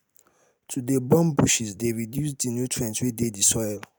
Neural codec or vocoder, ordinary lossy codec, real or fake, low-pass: none; none; real; none